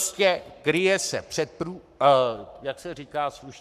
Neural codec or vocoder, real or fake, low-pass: codec, 44.1 kHz, 7.8 kbps, Pupu-Codec; fake; 14.4 kHz